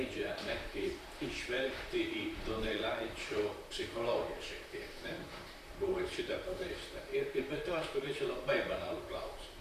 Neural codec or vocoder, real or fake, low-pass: vocoder, 44.1 kHz, 128 mel bands, Pupu-Vocoder; fake; 14.4 kHz